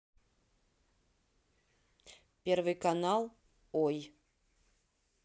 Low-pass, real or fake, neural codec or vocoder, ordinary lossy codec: none; real; none; none